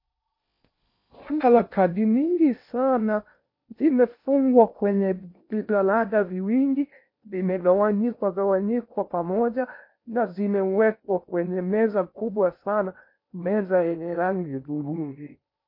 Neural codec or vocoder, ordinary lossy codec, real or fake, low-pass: codec, 16 kHz in and 24 kHz out, 0.8 kbps, FocalCodec, streaming, 65536 codes; MP3, 32 kbps; fake; 5.4 kHz